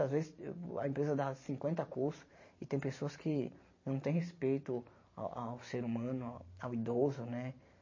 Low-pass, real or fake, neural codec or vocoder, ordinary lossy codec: 7.2 kHz; fake; vocoder, 44.1 kHz, 128 mel bands every 512 samples, BigVGAN v2; MP3, 32 kbps